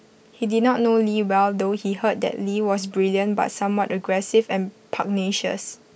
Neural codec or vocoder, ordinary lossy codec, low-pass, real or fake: none; none; none; real